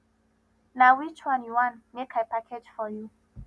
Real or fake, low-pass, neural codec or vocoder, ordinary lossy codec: real; none; none; none